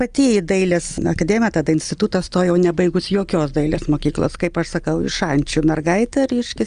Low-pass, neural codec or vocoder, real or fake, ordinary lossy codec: 9.9 kHz; none; real; Opus, 32 kbps